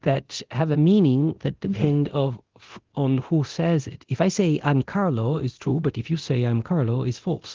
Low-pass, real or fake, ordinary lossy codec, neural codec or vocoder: 7.2 kHz; fake; Opus, 16 kbps; codec, 24 kHz, 0.9 kbps, DualCodec